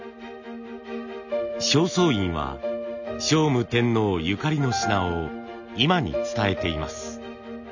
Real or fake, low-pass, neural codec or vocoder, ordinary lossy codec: real; 7.2 kHz; none; none